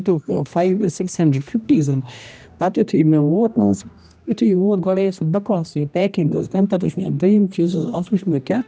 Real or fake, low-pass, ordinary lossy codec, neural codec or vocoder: fake; none; none; codec, 16 kHz, 1 kbps, X-Codec, HuBERT features, trained on general audio